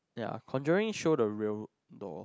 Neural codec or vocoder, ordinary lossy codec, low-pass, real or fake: none; none; none; real